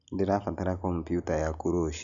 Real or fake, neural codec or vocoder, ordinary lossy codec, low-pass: real; none; none; 7.2 kHz